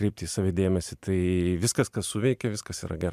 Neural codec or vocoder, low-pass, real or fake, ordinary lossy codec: vocoder, 44.1 kHz, 128 mel bands every 256 samples, BigVGAN v2; 14.4 kHz; fake; MP3, 96 kbps